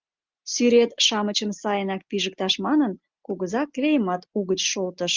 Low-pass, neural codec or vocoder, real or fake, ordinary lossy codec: 7.2 kHz; none; real; Opus, 32 kbps